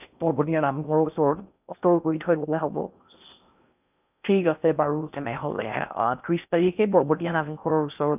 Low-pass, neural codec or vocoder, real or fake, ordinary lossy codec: 3.6 kHz; codec, 16 kHz in and 24 kHz out, 0.6 kbps, FocalCodec, streaming, 4096 codes; fake; none